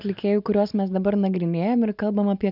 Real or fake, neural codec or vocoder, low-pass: real; none; 5.4 kHz